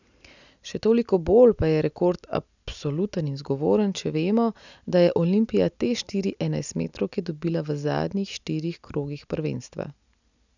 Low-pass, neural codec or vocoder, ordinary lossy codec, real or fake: 7.2 kHz; none; none; real